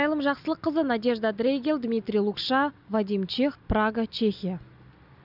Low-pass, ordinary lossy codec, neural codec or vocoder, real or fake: 5.4 kHz; none; none; real